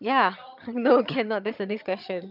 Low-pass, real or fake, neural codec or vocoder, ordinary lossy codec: 5.4 kHz; fake; vocoder, 22.05 kHz, 80 mel bands, HiFi-GAN; none